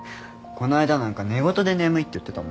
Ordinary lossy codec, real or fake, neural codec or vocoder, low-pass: none; real; none; none